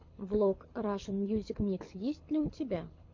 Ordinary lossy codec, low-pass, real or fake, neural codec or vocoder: MP3, 48 kbps; 7.2 kHz; fake; codec, 24 kHz, 6 kbps, HILCodec